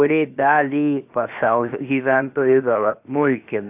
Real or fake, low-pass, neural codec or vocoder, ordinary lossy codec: fake; 3.6 kHz; codec, 16 kHz, 0.7 kbps, FocalCodec; AAC, 32 kbps